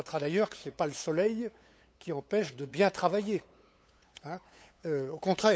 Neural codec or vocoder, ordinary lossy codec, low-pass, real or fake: codec, 16 kHz, 8 kbps, FunCodec, trained on LibriTTS, 25 frames a second; none; none; fake